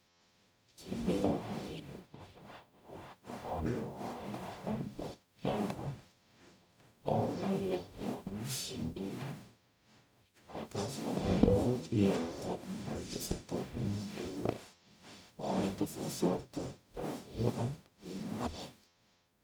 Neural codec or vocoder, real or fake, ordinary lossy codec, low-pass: codec, 44.1 kHz, 0.9 kbps, DAC; fake; none; none